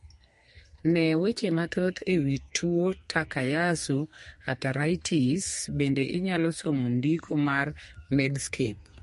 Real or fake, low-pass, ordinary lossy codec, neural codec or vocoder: fake; 14.4 kHz; MP3, 48 kbps; codec, 32 kHz, 1.9 kbps, SNAC